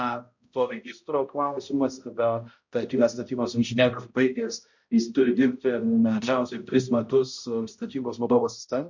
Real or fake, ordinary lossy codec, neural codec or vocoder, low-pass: fake; MP3, 48 kbps; codec, 16 kHz, 0.5 kbps, X-Codec, HuBERT features, trained on balanced general audio; 7.2 kHz